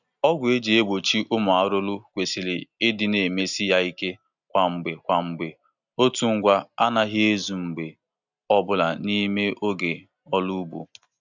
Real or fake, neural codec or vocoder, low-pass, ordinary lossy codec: real; none; 7.2 kHz; none